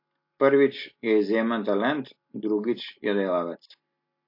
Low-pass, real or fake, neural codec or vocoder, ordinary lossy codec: 5.4 kHz; real; none; MP3, 32 kbps